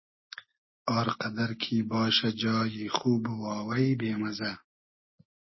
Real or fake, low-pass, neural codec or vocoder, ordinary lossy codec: real; 7.2 kHz; none; MP3, 24 kbps